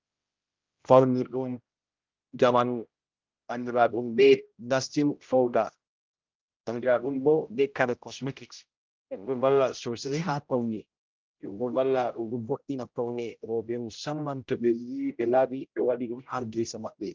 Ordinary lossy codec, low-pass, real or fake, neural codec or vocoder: Opus, 24 kbps; 7.2 kHz; fake; codec, 16 kHz, 0.5 kbps, X-Codec, HuBERT features, trained on general audio